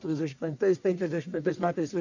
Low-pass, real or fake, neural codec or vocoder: 7.2 kHz; fake; codec, 44.1 kHz, 1.7 kbps, Pupu-Codec